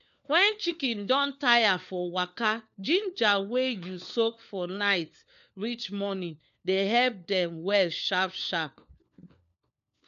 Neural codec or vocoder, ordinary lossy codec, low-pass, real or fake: codec, 16 kHz, 4 kbps, FunCodec, trained on LibriTTS, 50 frames a second; none; 7.2 kHz; fake